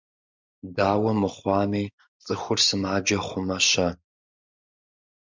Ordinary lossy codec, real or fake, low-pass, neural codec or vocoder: MP3, 64 kbps; real; 7.2 kHz; none